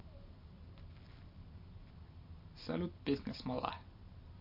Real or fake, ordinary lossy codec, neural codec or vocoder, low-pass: real; MP3, 32 kbps; none; 5.4 kHz